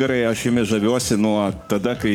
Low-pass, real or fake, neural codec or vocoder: 19.8 kHz; fake; codec, 44.1 kHz, 7.8 kbps, Pupu-Codec